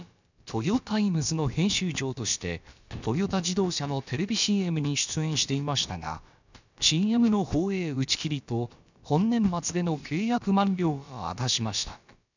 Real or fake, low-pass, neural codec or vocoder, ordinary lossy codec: fake; 7.2 kHz; codec, 16 kHz, about 1 kbps, DyCAST, with the encoder's durations; none